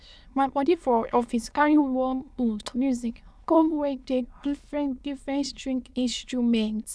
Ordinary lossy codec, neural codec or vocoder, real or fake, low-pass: none; autoencoder, 22.05 kHz, a latent of 192 numbers a frame, VITS, trained on many speakers; fake; none